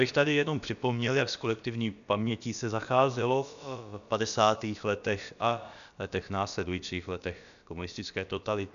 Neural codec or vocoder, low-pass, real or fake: codec, 16 kHz, about 1 kbps, DyCAST, with the encoder's durations; 7.2 kHz; fake